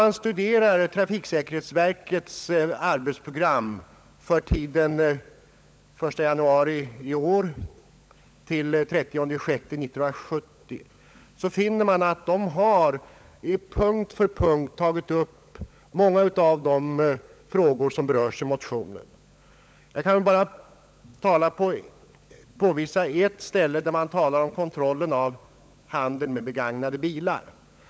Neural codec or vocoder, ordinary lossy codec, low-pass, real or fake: codec, 16 kHz, 16 kbps, FunCodec, trained on LibriTTS, 50 frames a second; none; none; fake